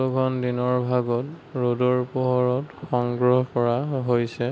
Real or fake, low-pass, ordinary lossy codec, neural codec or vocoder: real; none; none; none